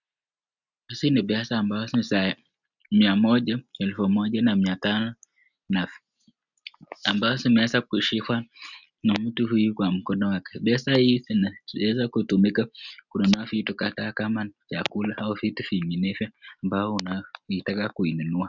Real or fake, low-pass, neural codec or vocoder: real; 7.2 kHz; none